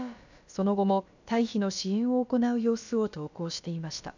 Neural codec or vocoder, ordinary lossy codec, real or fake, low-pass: codec, 16 kHz, about 1 kbps, DyCAST, with the encoder's durations; none; fake; 7.2 kHz